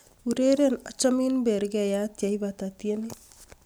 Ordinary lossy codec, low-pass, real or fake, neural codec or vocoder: none; none; real; none